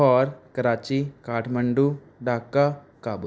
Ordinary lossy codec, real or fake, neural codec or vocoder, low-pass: none; real; none; none